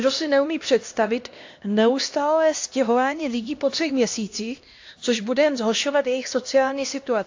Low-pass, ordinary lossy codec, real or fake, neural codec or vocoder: 7.2 kHz; AAC, 48 kbps; fake; codec, 16 kHz, 1 kbps, X-Codec, HuBERT features, trained on LibriSpeech